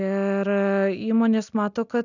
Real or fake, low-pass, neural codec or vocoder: real; 7.2 kHz; none